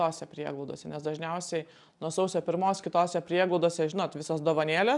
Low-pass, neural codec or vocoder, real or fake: 10.8 kHz; none; real